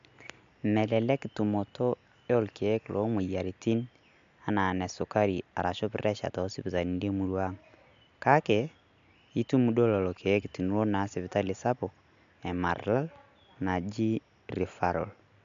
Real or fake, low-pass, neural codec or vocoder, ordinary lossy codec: real; 7.2 kHz; none; MP3, 64 kbps